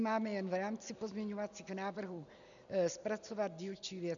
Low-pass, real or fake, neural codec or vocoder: 7.2 kHz; real; none